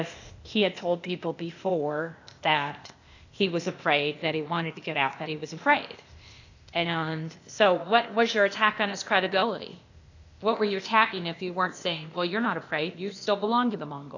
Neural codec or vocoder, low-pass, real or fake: codec, 16 kHz, 0.8 kbps, ZipCodec; 7.2 kHz; fake